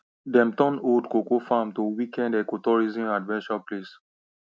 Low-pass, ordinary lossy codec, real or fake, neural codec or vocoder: none; none; real; none